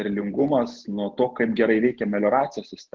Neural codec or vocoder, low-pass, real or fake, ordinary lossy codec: none; 7.2 kHz; real; Opus, 16 kbps